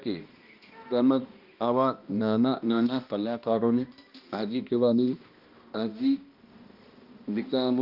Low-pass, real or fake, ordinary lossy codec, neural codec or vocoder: 5.4 kHz; fake; Opus, 32 kbps; codec, 16 kHz, 1 kbps, X-Codec, HuBERT features, trained on balanced general audio